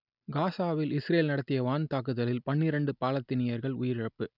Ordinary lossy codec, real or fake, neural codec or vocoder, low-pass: none; real; none; 5.4 kHz